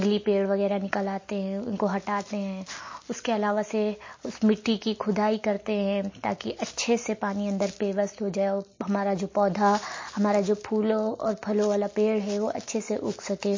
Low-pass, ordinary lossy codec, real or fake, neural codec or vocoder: 7.2 kHz; MP3, 32 kbps; real; none